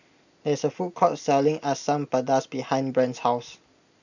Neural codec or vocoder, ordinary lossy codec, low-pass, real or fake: none; none; 7.2 kHz; real